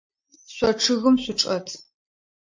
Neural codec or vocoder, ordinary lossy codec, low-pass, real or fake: none; MP3, 48 kbps; 7.2 kHz; real